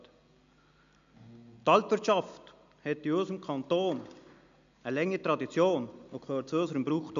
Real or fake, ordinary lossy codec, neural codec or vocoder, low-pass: real; MP3, 64 kbps; none; 7.2 kHz